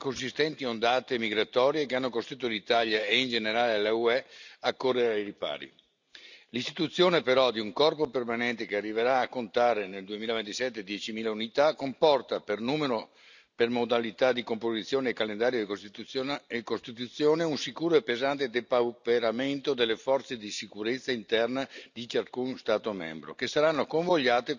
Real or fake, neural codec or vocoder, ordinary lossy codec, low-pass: real; none; none; 7.2 kHz